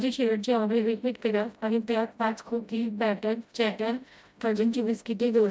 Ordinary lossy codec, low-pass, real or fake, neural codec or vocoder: none; none; fake; codec, 16 kHz, 0.5 kbps, FreqCodec, smaller model